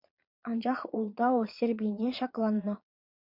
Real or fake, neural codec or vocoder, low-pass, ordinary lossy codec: fake; vocoder, 22.05 kHz, 80 mel bands, Vocos; 5.4 kHz; MP3, 48 kbps